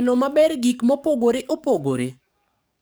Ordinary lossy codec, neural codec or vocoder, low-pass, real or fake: none; codec, 44.1 kHz, 7.8 kbps, DAC; none; fake